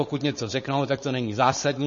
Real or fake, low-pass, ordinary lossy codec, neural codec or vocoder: fake; 7.2 kHz; MP3, 32 kbps; codec, 16 kHz, 4.8 kbps, FACodec